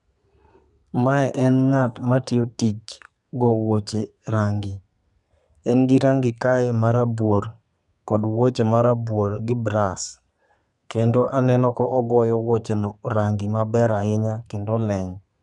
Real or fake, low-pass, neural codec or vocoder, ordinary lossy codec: fake; 10.8 kHz; codec, 32 kHz, 1.9 kbps, SNAC; none